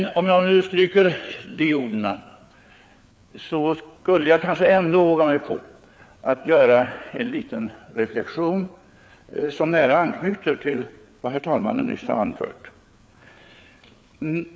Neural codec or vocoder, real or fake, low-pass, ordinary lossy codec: codec, 16 kHz, 4 kbps, FreqCodec, larger model; fake; none; none